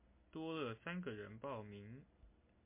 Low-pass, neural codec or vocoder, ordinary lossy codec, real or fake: 3.6 kHz; none; MP3, 24 kbps; real